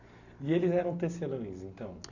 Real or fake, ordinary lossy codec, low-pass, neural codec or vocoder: real; none; 7.2 kHz; none